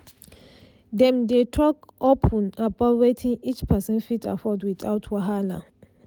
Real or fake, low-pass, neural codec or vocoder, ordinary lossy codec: real; none; none; none